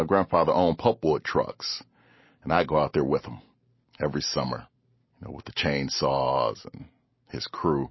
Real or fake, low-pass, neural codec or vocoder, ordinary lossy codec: real; 7.2 kHz; none; MP3, 24 kbps